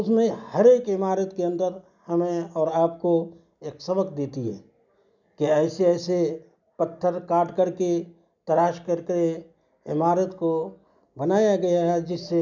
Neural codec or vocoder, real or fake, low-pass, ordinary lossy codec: none; real; 7.2 kHz; none